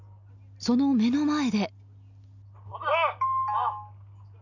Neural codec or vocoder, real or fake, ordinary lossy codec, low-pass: none; real; none; 7.2 kHz